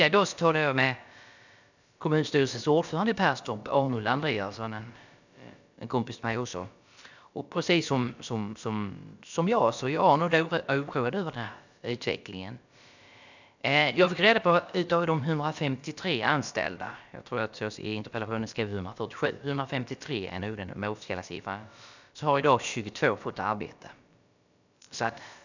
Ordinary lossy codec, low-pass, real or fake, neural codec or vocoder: none; 7.2 kHz; fake; codec, 16 kHz, about 1 kbps, DyCAST, with the encoder's durations